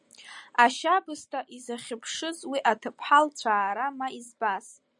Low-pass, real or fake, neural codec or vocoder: 10.8 kHz; real; none